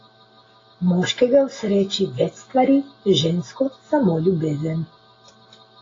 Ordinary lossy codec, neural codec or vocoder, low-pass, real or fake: AAC, 32 kbps; none; 7.2 kHz; real